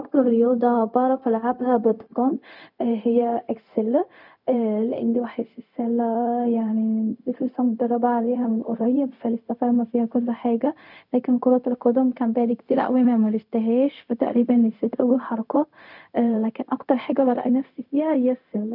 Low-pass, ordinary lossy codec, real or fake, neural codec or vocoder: 5.4 kHz; none; fake; codec, 16 kHz, 0.4 kbps, LongCat-Audio-Codec